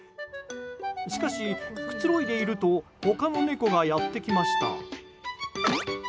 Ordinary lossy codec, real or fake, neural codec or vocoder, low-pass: none; real; none; none